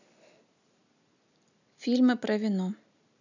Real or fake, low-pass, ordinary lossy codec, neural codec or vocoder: real; 7.2 kHz; none; none